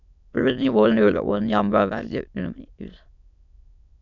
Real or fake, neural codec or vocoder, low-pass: fake; autoencoder, 22.05 kHz, a latent of 192 numbers a frame, VITS, trained on many speakers; 7.2 kHz